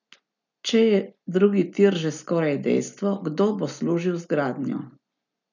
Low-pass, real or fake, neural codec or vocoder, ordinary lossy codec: 7.2 kHz; real; none; none